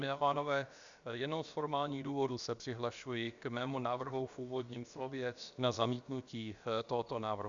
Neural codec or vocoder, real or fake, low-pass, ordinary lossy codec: codec, 16 kHz, about 1 kbps, DyCAST, with the encoder's durations; fake; 7.2 kHz; Opus, 64 kbps